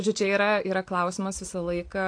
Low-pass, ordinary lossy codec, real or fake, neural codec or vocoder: 9.9 kHz; AAC, 48 kbps; real; none